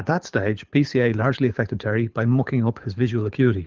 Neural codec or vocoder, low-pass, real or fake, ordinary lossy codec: codec, 24 kHz, 6 kbps, HILCodec; 7.2 kHz; fake; Opus, 32 kbps